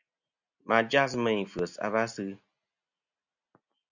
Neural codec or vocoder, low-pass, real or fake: none; 7.2 kHz; real